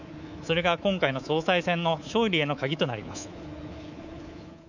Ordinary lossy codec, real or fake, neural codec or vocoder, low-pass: none; fake; codec, 24 kHz, 3.1 kbps, DualCodec; 7.2 kHz